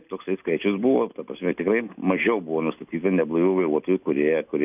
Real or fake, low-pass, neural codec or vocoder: real; 3.6 kHz; none